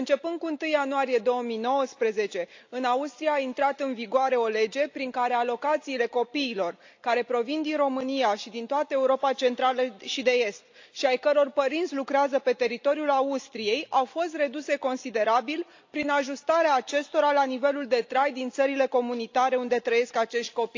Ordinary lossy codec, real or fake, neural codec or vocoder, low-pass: AAC, 48 kbps; real; none; 7.2 kHz